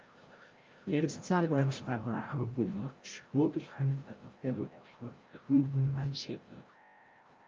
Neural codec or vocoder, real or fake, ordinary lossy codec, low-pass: codec, 16 kHz, 0.5 kbps, FreqCodec, larger model; fake; Opus, 24 kbps; 7.2 kHz